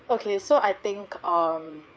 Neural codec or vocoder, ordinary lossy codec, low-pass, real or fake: codec, 16 kHz, 4 kbps, FreqCodec, larger model; none; none; fake